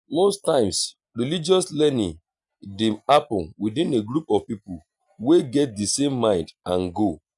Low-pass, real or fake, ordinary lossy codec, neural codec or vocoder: 10.8 kHz; real; none; none